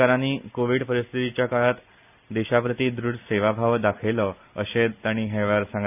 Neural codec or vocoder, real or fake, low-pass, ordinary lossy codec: none; real; 3.6 kHz; none